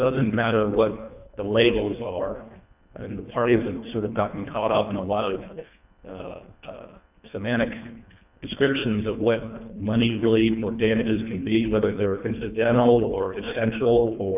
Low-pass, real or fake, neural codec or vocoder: 3.6 kHz; fake; codec, 24 kHz, 1.5 kbps, HILCodec